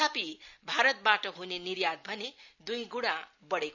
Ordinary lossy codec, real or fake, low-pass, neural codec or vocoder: none; real; 7.2 kHz; none